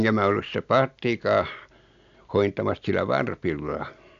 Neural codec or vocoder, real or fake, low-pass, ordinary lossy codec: none; real; 7.2 kHz; AAC, 64 kbps